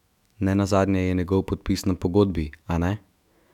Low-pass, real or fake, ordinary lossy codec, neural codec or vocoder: 19.8 kHz; fake; none; autoencoder, 48 kHz, 128 numbers a frame, DAC-VAE, trained on Japanese speech